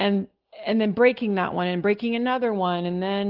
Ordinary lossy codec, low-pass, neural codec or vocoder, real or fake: Opus, 32 kbps; 5.4 kHz; none; real